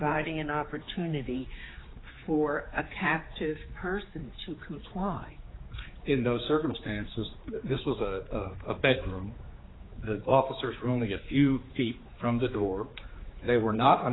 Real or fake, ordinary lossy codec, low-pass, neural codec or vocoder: fake; AAC, 16 kbps; 7.2 kHz; codec, 16 kHz, 4 kbps, X-Codec, HuBERT features, trained on general audio